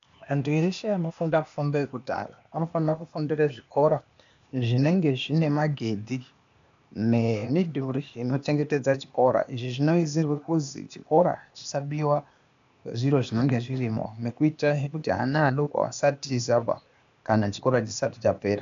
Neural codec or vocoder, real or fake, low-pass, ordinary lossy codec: codec, 16 kHz, 0.8 kbps, ZipCodec; fake; 7.2 kHz; MP3, 64 kbps